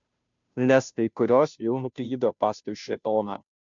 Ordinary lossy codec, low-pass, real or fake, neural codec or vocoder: MP3, 64 kbps; 7.2 kHz; fake; codec, 16 kHz, 0.5 kbps, FunCodec, trained on Chinese and English, 25 frames a second